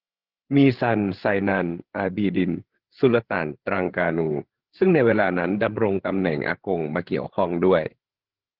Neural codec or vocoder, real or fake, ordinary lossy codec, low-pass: codec, 16 kHz, 8 kbps, FreqCodec, larger model; fake; Opus, 16 kbps; 5.4 kHz